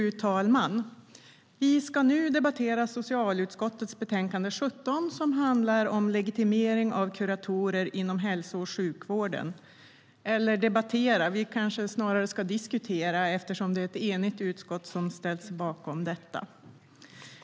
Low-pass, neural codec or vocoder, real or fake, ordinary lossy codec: none; none; real; none